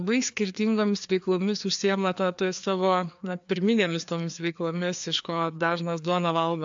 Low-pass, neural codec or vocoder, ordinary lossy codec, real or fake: 7.2 kHz; codec, 16 kHz, 2 kbps, FreqCodec, larger model; AAC, 64 kbps; fake